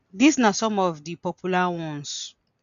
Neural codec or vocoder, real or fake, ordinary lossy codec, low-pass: none; real; none; 7.2 kHz